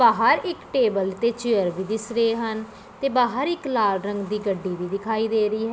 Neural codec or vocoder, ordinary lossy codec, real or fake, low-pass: none; none; real; none